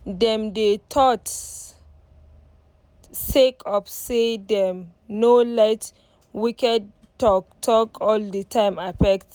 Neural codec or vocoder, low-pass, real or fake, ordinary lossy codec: none; none; real; none